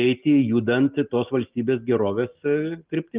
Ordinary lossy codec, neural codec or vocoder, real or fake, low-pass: Opus, 32 kbps; none; real; 3.6 kHz